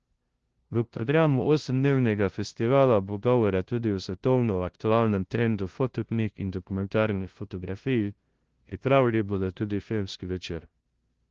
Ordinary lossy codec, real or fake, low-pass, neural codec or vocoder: Opus, 16 kbps; fake; 7.2 kHz; codec, 16 kHz, 0.5 kbps, FunCodec, trained on LibriTTS, 25 frames a second